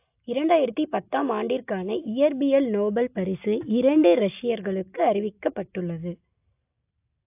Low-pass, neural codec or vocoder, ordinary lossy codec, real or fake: 3.6 kHz; none; AAC, 32 kbps; real